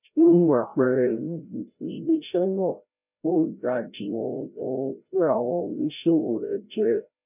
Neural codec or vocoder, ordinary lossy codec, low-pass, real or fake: codec, 16 kHz, 0.5 kbps, FreqCodec, larger model; none; 3.6 kHz; fake